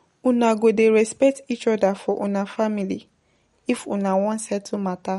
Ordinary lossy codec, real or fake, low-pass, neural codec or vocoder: MP3, 48 kbps; real; 19.8 kHz; none